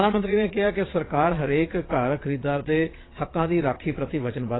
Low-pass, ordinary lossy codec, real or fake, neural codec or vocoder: 7.2 kHz; AAC, 16 kbps; fake; vocoder, 22.05 kHz, 80 mel bands, Vocos